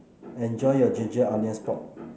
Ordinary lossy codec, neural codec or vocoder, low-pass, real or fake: none; none; none; real